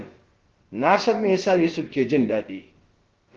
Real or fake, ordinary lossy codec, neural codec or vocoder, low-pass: fake; Opus, 16 kbps; codec, 16 kHz, about 1 kbps, DyCAST, with the encoder's durations; 7.2 kHz